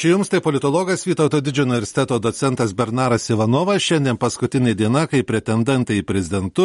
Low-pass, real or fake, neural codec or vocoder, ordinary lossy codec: 10.8 kHz; real; none; MP3, 48 kbps